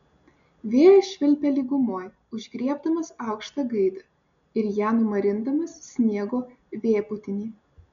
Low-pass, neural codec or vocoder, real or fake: 7.2 kHz; none; real